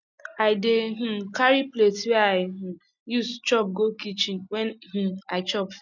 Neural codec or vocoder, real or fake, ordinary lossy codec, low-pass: none; real; none; 7.2 kHz